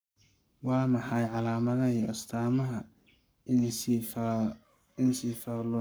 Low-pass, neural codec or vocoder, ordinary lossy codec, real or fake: none; codec, 44.1 kHz, 7.8 kbps, Pupu-Codec; none; fake